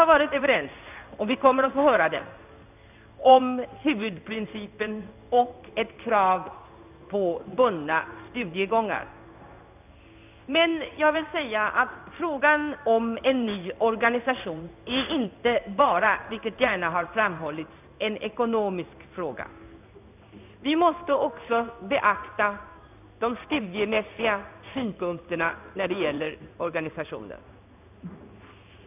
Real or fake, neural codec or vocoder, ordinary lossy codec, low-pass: fake; codec, 16 kHz in and 24 kHz out, 1 kbps, XY-Tokenizer; none; 3.6 kHz